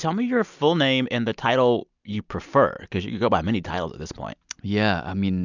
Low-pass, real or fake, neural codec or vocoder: 7.2 kHz; real; none